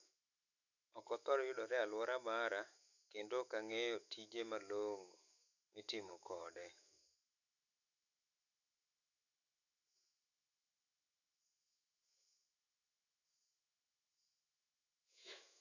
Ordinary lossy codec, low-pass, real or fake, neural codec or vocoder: none; 7.2 kHz; fake; autoencoder, 48 kHz, 128 numbers a frame, DAC-VAE, trained on Japanese speech